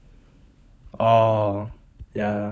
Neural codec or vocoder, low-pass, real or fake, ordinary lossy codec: codec, 16 kHz, 16 kbps, FunCodec, trained on LibriTTS, 50 frames a second; none; fake; none